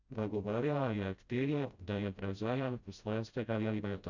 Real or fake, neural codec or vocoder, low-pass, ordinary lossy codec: fake; codec, 16 kHz, 0.5 kbps, FreqCodec, smaller model; 7.2 kHz; Opus, 64 kbps